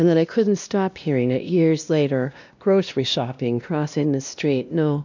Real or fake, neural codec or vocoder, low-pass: fake; codec, 16 kHz, 1 kbps, X-Codec, WavLM features, trained on Multilingual LibriSpeech; 7.2 kHz